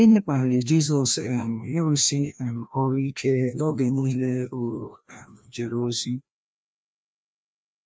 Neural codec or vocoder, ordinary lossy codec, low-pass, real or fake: codec, 16 kHz, 1 kbps, FreqCodec, larger model; none; none; fake